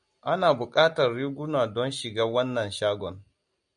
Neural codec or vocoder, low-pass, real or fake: none; 9.9 kHz; real